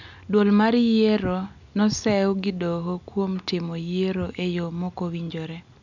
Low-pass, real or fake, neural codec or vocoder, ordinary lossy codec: 7.2 kHz; real; none; none